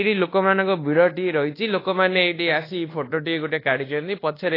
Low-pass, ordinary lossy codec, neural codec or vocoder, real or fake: 5.4 kHz; AAC, 24 kbps; codec, 24 kHz, 1.2 kbps, DualCodec; fake